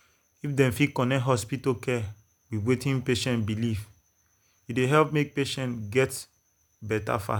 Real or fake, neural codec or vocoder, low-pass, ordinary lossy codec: real; none; none; none